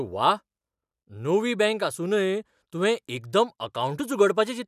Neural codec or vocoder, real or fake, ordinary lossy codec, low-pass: none; real; none; 14.4 kHz